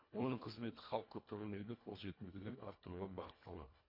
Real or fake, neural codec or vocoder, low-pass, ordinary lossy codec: fake; codec, 24 kHz, 1.5 kbps, HILCodec; 5.4 kHz; MP3, 32 kbps